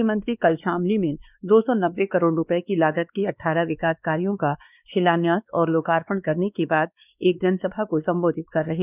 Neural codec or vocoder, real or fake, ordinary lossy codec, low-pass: codec, 16 kHz, 2 kbps, X-Codec, WavLM features, trained on Multilingual LibriSpeech; fake; none; 3.6 kHz